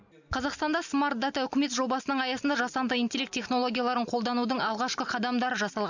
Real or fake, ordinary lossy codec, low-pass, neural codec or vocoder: real; none; 7.2 kHz; none